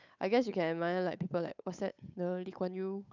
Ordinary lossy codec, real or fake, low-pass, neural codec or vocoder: none; fake; 7.2 kHz; codec, 16 kHz, 8 kbps, FunCodec, trained on Chinese and English, 25 frames a second